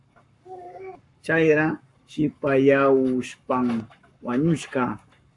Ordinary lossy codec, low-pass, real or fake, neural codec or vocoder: AAC, 64 kbps; 10.8 kHz; fake; codec, 44.1 kHz, 7.8 kbps, Pupu-Codec